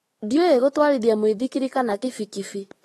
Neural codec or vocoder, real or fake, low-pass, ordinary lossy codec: autoencoder, 48 kHz, 128 numbers a frame, DAC-VAE, trained on Japanese speech; fake; 19.8 kHz; AAC, 32 kbps